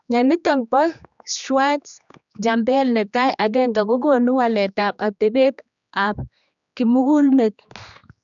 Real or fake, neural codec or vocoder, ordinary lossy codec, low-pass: fake; codec, 16 kHz, 2 kbps, X-Codec, HuBERT features, trained on general audio; none; 7.2 kHz